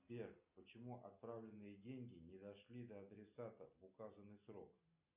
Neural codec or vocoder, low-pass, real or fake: none; 3.6 kHz; real